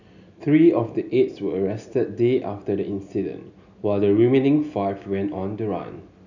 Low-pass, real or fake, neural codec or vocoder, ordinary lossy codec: 7.2 kHz; real; none; none